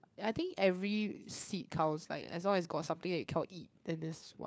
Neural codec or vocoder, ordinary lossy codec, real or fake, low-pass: codec, 16 kHz, 4 kbps, FunCodec, trained on LibriTTS, 50 frames a second; none; fake; none